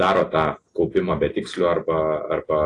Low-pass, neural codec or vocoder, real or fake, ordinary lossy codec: 10.8 kHz; none; real; AAC, 48 kbps